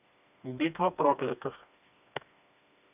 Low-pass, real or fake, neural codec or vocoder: 3.6 kHz; fake; codec, 16 kHz, 2 kbps, FreqCodec, smaller model